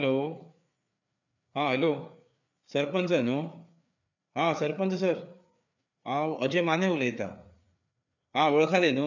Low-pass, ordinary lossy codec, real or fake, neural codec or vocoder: 7.2 kHz; none; fake; codec, 16 kHz, 4 kbps, FreqCodec, larger model